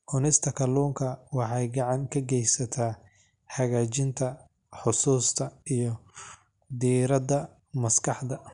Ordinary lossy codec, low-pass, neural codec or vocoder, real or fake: none; 10.8 kHz; none; real